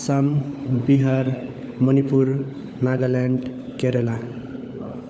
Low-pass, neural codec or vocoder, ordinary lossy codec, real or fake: none; codec, 16 kHz, 16 kbps, FunCodec, trained on LibriTTS, 50 frames a second; none; fake